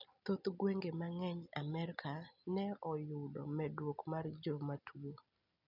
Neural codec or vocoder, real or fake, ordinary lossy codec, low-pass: none; real; none; 5.4 kHz